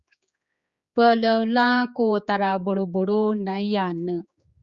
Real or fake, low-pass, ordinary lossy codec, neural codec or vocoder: fake; 7.2 kHz; Opus, 64 kbps; codec, 16 kHz, 4 kbps, X-Codec, HuBERT features, trained on general audio